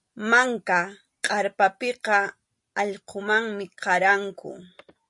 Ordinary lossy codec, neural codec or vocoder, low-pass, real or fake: MP3, 48 kbps; none; 10.8 kHz; real